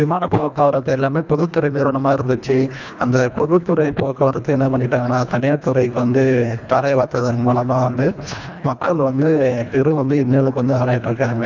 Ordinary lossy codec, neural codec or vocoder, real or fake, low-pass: none; codec, 24 kHz, 1.5 kbps, HILCodec; fake; 7.2 kHz